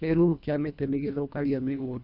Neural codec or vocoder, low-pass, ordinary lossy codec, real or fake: codec, 24 kHz, 1.5 kbps, HILCodec; 5.4 kHz; none; fake